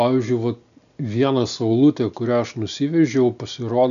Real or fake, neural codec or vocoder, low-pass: real; none; 7.2 kHz